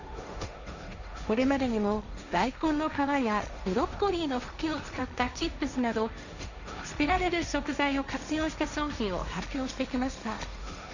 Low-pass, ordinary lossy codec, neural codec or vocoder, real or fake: 7.2 kHz; none; codec, 16 kHz, 1.1 kbps, Voila-Tokenizer; fake